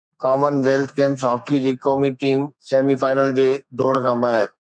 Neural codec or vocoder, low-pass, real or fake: codec, 32 kHz, 1.9 kbps, SNAC; 9.9 kHz; fake